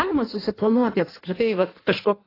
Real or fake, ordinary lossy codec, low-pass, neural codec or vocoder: fake; AAC, 24 kbps; 5.4 kHz; codec, 16 kHz in and 24 kHz out, 1.1 kbps, FireRedTTS-2 codec